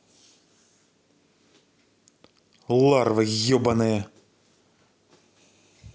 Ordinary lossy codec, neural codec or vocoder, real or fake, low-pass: none; none; real; none